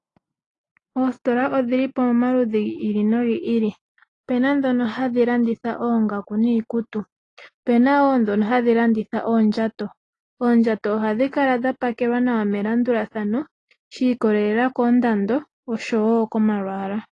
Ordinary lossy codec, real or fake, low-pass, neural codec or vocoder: AAC, 32 kbps; real; 10.8 kHz; none